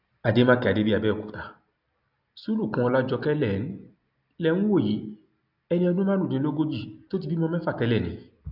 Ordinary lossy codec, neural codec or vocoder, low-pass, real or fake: none; none; 5.4 kHz; real